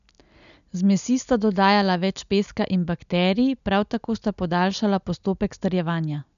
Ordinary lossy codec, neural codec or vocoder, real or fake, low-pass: none; none; real; 7.2 kHz